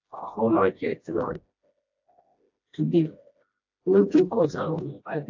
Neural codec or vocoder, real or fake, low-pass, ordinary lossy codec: codec, 16 kHz, 1 kbps, FreqCodec, smaller model; fake; 7.2 kHz; AAC, 48 kbps